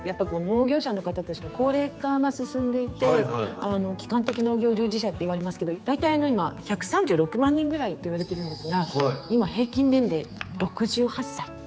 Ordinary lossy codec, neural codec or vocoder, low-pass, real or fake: none; codec, 16 kHz, 4 kbps, X-Codec, HuBERT features, trained on general audio; none; fake